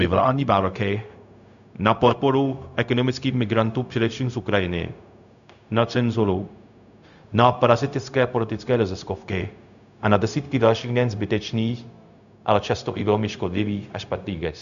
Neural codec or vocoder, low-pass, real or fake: codec, 16 kHz, 0.4 kbps, LongCat-Audio-Codec; 7.2 kHz; fake